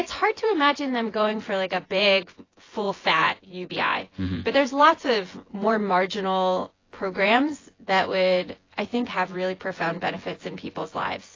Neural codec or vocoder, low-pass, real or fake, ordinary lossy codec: vocoder, 24 kHz, 100 mel bands, Vocos; 7.2 kHz; fake; AAC, 32 kbps